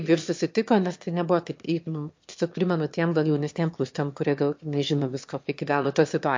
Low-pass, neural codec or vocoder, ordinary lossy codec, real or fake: 7.2 kHz; autoencoder, 22.05 kHz, a latent of 192 numbers a frame, VITS, trained on one speaker; MP3, 48 kbps; fake